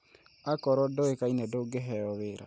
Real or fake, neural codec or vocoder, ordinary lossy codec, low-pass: real; none; none; none